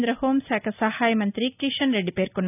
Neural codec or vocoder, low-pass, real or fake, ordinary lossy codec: none; 3.6 kHz; real; none